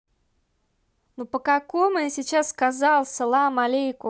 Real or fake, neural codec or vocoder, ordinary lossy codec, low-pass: real; none; none; none